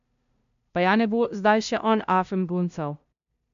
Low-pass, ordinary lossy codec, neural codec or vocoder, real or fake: 7.2 kHz; MP3, 96 kbps; codec, 16 kHz, 0.5 kbps, FunCodec, trained on LibriTTS, 25 frames a second; fake